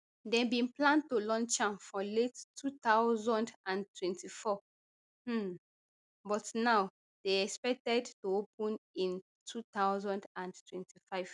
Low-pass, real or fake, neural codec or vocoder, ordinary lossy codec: 10.8 kHz; real; none; none